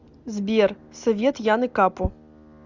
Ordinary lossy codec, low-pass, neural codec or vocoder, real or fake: Opus, 64 kbps; 7.2 kHz; none; real